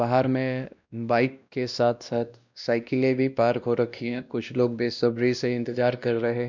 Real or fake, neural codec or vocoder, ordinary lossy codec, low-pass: fake; codec, 16 kHz, 1 kbps, X-Codec, WavLM features, trained on Multilingual LibriSpeech; none; 7.2 kHz